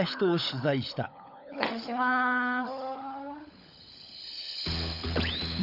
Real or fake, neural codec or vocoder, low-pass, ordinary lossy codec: fake; codec, 16 kHz, 16 kbps, FunCodec, trained on LibriTTS, 50 frames a second; 5.4 kHz; none